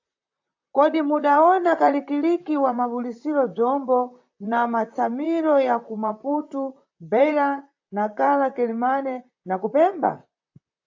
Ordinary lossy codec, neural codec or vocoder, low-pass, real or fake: AAC, 48 kbps; vocoder, 44.1 kHz, 128 mel bands, Pupu-Vocoder; 7.2 kHz; fake